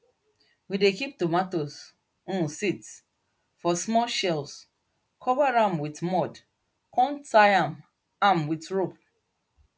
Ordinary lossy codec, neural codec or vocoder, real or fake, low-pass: none; none; real; none